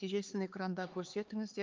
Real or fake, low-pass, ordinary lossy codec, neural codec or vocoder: fake; 7.2 kHz; Opus, 32 kbps; codec, 16 kHz, 4 kbps, X-Codec, HuBERT features, trained on LibriSpeech